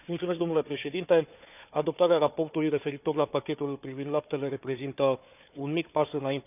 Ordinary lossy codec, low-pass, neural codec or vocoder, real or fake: none; 3.6 kHz; codec, 16 kHz, 4 kbps, FunCodec, trained on Chinese and English, 50 frames a second; fake